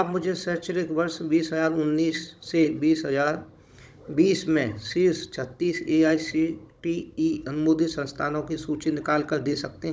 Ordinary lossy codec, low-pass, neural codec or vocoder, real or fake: none; none; codec, 16 kHz, 16 kbps, FunCodec, trained on Chinese and English, 50 frames a second; fake